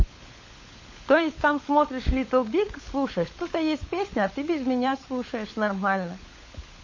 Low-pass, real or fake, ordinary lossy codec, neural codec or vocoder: 7.2 kHz; fake; MP3, 32 kbps; codec, 16 kHz, 16 kbps, FunCodec, trained on LibriTTS, 50 frames a second